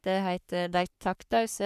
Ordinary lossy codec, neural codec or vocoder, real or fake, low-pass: none; codec, 44.1 kHz, 3.4 kbps, Pupu-Codec; fake; 14.4 kHz